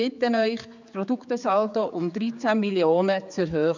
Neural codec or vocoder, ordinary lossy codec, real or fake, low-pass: codec, 16 kHz, 4 kbps, X-Codec, HuBERT features, trained on general audio; none; fake; 7.2 kHz